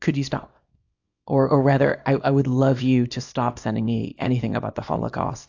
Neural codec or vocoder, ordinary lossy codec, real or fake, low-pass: codec, 24 kHz, 0.9 kbps, WavTokenizer, small release; AAC, 48 kbps; fake; 7.2 kHz